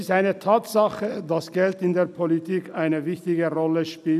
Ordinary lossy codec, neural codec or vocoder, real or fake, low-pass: none; none; real; 14.4 kHz